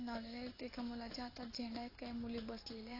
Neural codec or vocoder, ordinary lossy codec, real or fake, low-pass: none; AAC, 24 kbps; real; 5.4 kHz